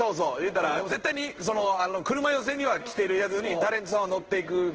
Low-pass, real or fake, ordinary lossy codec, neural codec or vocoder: 7.2 kHz; fake; Opus, 16 kbps; codec, 16 kHz in and 24 kHz out, 1 kbps, XY-Tokenizer